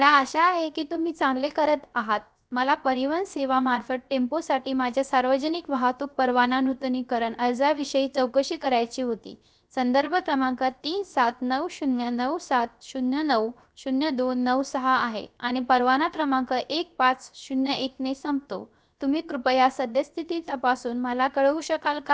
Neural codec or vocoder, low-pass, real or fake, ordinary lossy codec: codec, 16 kHz, 0.7 kbps, FocalCodec; none; fake; none